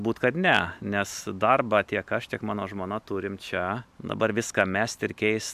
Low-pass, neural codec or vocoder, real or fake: 14.4 kHz; none; real